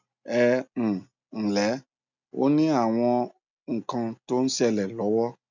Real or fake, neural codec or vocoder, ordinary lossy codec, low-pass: real; none; none; 7.2 kHz